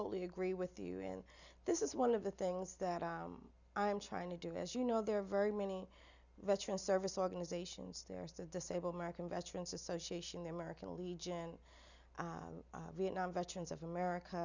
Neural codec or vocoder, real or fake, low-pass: none; real; 7.2 kHz